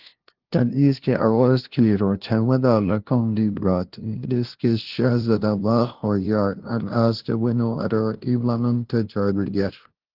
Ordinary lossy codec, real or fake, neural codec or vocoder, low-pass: Opus, 16 kbps; fake; codec, 16 kHz, 0.5 kbps, FunCodec, trained on LibriTTS, 25 frames a second; 5.4 kHz